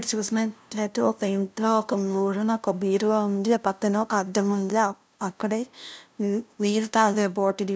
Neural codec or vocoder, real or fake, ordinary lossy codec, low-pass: codec, 16 kHz, 0.5 kbps, FunCodec, trained on LibriTTS, 25 frames a second; fake; none; none